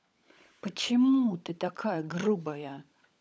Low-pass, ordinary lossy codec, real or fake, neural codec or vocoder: none; none; fake; codec, 16 kHz, 16 kbps, FunCodec, trained on LibriTTS, 50 frames a second